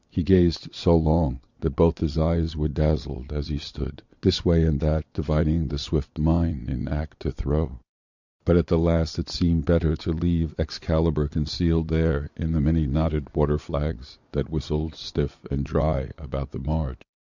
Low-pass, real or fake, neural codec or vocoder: 7.2 kHz; fake; vocoder, 22.05 kHz, 80 mel bands, Vocos